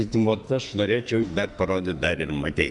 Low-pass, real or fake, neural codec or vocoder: 10.8 kHz; fake; codec, 44.1 kHz, 2.6 kbps, SNAC